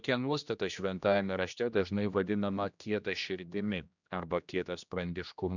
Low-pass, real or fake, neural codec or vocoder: 7.2 kHz; fake; codec, 16 kHz, 1 kbps, X-Codec, HuBERT features, trained on general audio